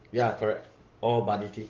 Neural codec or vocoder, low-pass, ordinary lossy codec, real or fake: codec, 44.1 kHz, 7.8 kbps, DAC; 7.2 kHz; Opus, 16 kbps; fake